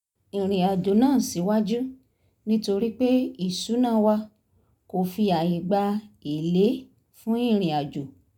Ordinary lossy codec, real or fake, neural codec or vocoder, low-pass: none; real; none; 19.8 kHz